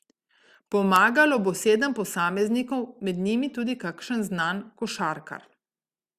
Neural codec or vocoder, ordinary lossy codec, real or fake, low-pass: none; Opus, 64 kbps; real; 14.4 kHz